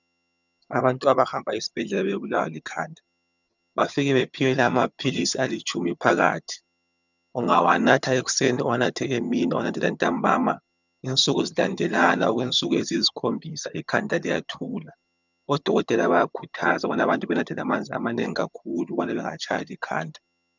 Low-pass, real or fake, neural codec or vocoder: 7.2 kHz; fake; vocoder, 22.05 kHz, 80 mel bands, HiFi-GAN